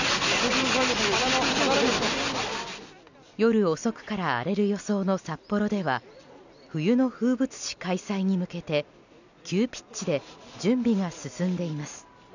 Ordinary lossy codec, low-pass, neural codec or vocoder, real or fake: none; 7.2 kHz; none; real